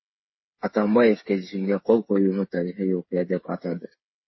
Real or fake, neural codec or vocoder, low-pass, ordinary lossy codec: fake; codec, 16 kHz, 8 kbps, FreqCodec, smaller model; 7.2 kHz; MP3, 24 kbps